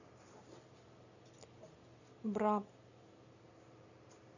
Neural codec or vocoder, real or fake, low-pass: none; real; 7.2 kHz